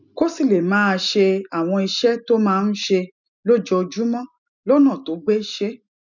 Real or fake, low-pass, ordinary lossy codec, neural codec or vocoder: real; 7.2 kHz; none; none